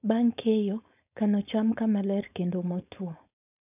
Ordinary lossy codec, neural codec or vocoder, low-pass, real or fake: none; codec, 16 kHz, 4.8 kbps, FACodec; 3.6 kHz; fake